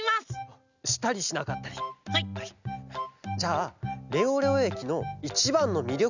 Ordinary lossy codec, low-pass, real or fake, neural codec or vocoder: none; 7.2 kHz; real; none